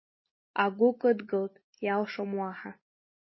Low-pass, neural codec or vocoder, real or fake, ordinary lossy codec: 7.2 kHz; none; real; MP3, 24 kbps